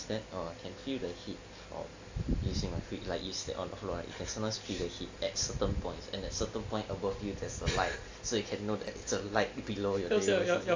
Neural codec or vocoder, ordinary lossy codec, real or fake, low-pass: none; AAC, 48 kbps; real; 7.2 kHz